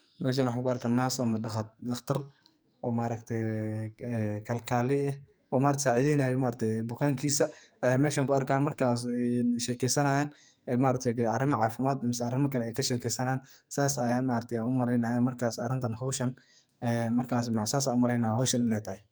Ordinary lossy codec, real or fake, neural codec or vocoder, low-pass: none; fake; codec, 44.1 kHz, 2.6 kbps, SNAC; none